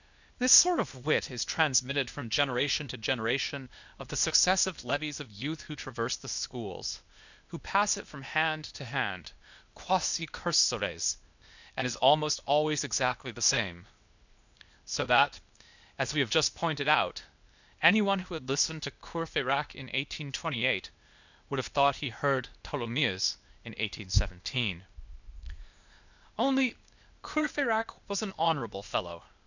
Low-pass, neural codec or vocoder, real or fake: 7.2 kHz; codec, 16 kHz, 0.8 kbps, ZipCodec; fake